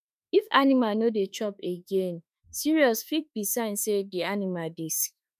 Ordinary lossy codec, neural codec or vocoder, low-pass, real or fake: none; autoencoder, 48 kHz, 32 numbers a frame, DAC-VAE, trained on Japanese speech; 14.4 kHz; fake